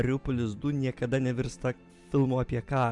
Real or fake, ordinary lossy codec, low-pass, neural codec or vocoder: real; MP3, 96 kbps; 10.8 kHz; none